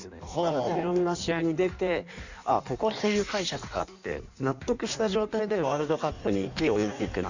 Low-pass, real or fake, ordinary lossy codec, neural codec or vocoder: 7.2 kHz; fake; none; codec, 16 kHz in and 24 kHz out, 1.1 kbps, FireRedTTS-2 codec